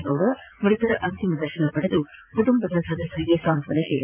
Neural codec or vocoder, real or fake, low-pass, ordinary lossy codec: vocoder, 44.1 kHz, 80 mel bands, Vocos; fake; 3.6 kHz; AAC, 32 kbps